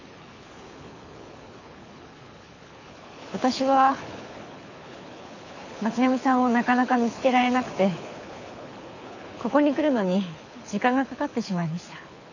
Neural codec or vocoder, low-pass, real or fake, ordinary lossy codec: codec, 24 kHz, 6 kbps, HILCodec; 7.2 kHz; fake; none